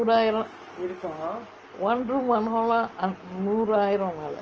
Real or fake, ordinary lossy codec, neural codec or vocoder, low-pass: real; Opus, 24 kbps; none; 7.2 kHz